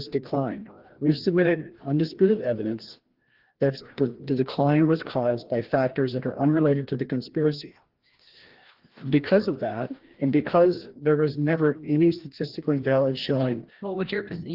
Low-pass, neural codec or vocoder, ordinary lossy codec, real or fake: 5.4 kHz; codec, 16 kHz, 1 kbps, FreqCodec, larger model; Opus, 16 kbps; fake